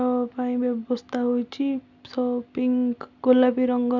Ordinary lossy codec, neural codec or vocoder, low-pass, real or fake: none; none; 7.2 kHz; real